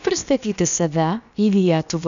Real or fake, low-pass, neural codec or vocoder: fake; 7.2 kHz; codec, 16 kHz, about 1 kbps, DyCAST, with the encoder's durations